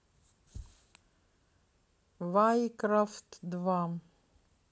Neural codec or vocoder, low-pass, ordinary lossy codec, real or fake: none; none; none; real